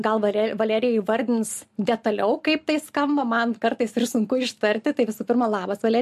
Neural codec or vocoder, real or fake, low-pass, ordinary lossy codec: none; real; 14.4 kHz; MP3, 64 kbps